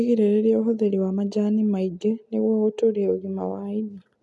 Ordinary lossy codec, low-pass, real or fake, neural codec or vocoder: none; none; real; none